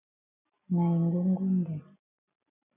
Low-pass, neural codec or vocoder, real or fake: 3.6 kHz; none; real